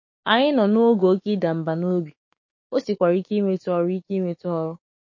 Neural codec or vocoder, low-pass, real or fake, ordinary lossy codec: codec, 16 kHz, 6 kbps, DAC; 7.2 kHz; fake; MP3, 32 kbps